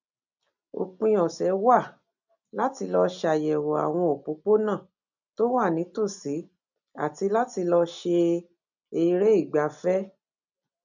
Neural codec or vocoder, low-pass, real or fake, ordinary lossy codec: none; 7.2 kHz; real; none